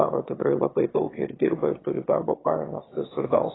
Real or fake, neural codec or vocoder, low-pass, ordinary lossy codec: fake; autoencoder, 22.05 kHz, a latent of 192 numbers a frame, VITS, trained on one speaker; 7.2 kHz; AAC, 16 kbps